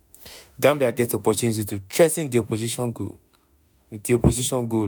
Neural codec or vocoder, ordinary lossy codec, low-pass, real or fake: autoencoder, 48 kHz, 32 numbers a frame, DAC-VAE, trained on Japanese speech; none; none; fake